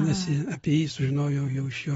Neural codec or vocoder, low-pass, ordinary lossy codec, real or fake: none; 19.8 kHz; AAC, 24 kbps; real